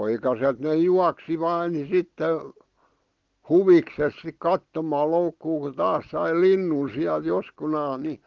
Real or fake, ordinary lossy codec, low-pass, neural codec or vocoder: real; Opus, 16 kbps; 7.2 kHz; none